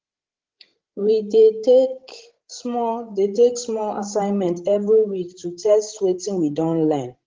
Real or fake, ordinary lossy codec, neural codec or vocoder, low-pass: fake; Opus, 16 kbps; codec, 16 kHz, 16 kbps, FreqCodec, larger model; 7.2 kHz